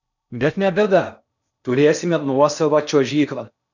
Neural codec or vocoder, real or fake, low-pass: codec, 16 kHz in and 24 kHz out, 0.6 kbps, FocalCodec, streaming, 4096 codes; fake; 7.2 kHz